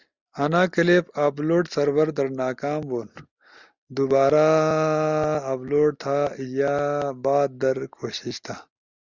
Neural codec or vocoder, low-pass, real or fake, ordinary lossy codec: none; 7.2 kHz; real; Opus, 64 kbps